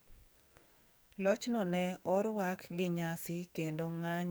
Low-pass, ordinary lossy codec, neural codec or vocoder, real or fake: none; none; codec, 44.1 kHz, 2.6 kbps, SNAC; fake